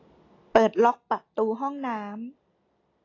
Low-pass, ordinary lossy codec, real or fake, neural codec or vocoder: 7.2 kHz; AAC, 32 kbps; real; none